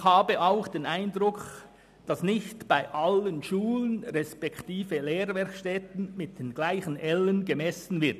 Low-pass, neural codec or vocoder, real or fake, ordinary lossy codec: 14.4 kHz; none; real; none